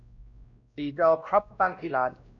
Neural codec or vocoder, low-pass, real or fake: codec, 16 kHz, 1 kbps, X-Codec, WavLM features, trained on Multilingual LibriSpeech; 7.2 kHz; fake